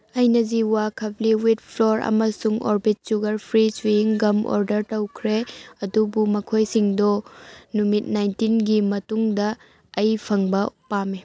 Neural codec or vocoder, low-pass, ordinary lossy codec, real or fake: none; none; none; real